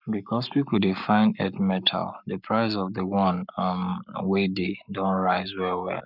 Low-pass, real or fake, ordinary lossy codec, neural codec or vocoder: 5.4 kHz; fake; none; codec, 44.1 kHz, 7.8 kbps, Pupu-Codec